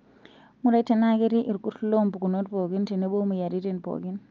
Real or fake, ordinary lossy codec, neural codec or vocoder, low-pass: real; Opus, 24 kbps; none; 7.2 kHz